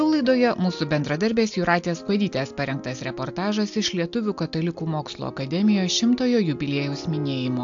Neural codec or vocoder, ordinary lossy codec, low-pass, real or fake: none; AAC, 64 kbps; 7.2 kHz; real